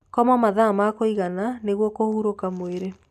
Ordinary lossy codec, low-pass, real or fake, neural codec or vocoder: none; 14.4 kHz; real; none